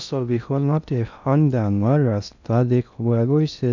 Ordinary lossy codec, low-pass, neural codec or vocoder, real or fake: none; 7.2 kHz; codec, 16 kHz in and 24 kHz out, 0.6 kbps, FocalCodec, streaming, 2048 codes; fake